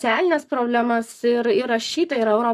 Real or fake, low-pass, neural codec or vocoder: fake; 14.4 kHz; codec, 44.1 kHz, 7.8 kbps, Pupu-Codec